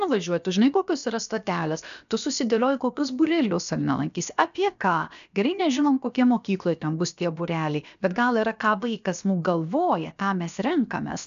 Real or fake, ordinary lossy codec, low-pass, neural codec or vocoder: fake; MP3, 96 kbps; 7.2 kHz; codec, 16 kHz, about 1 kbps, DyCAST, with the encoder's durations